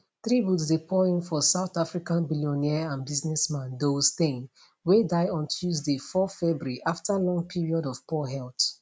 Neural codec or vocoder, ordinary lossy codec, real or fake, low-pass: none; none; real; none